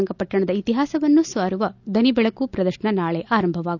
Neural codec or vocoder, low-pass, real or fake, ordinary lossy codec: none; 7.2 kHz; real; none